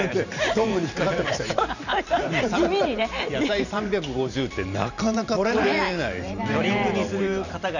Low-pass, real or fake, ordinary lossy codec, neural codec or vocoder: 7.2 kHz; fake; none; vocoder, 44.1 kHz, 128 mel bands every 512 samples, BigVGAN v2